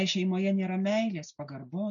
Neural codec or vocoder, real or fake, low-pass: none; real; 7.2 kHz